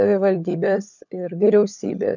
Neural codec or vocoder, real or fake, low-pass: codec, 16 kHz, 16 kbps, FunCodec, trained on LibriTTS, 50 frames a second; fake; 7.2 kHz